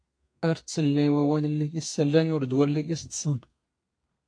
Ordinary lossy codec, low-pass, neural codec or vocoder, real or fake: AAC, 48 kbps; 9.9 kHz; codec, 32 kHz, 1.9 kbps, SNAC; fake